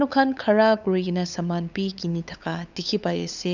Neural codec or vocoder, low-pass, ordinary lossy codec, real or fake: codec, 16 kHz, 8 kbps, FunCodec, trained on LibriTTS, 25 frames a second; 7.2 kHz; none; fake